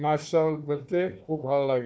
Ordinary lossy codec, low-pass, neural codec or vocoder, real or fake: none; none; codec, 16 kHz, 1 kbps, FunCodec, trained on Chinese and English, 50 frames a second; fake